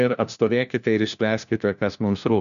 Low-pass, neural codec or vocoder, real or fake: 7.2 kHz; codec, 16 kHz, 1 kbps, FunCodec, trained on LibriTTS, 50 frames a second; fake